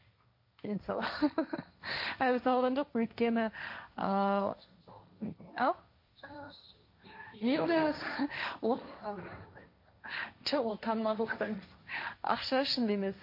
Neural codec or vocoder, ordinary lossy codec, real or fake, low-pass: codec, 16 kHz, 1.1 kbps, Voila-Tokenizer; MP3, 32 kbps; fake; 5.4 kHz